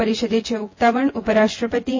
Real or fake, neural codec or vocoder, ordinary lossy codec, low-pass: fake; vocoder, 24 kHz, 100 mel bands, Vocos; MP3, 32 kbps; 7.2 kHz